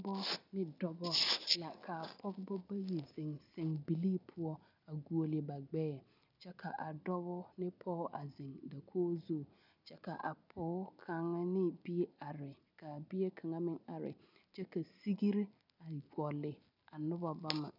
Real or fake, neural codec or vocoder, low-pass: real; none; 5.4 kHz